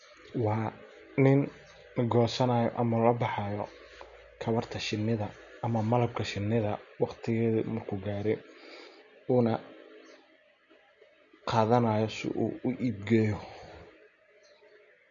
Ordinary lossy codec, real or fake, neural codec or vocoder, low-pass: none; real; none; 7.2 kHz